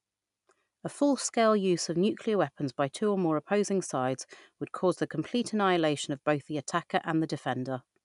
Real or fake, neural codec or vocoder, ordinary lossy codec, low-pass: real; none; none; 10.8 kHz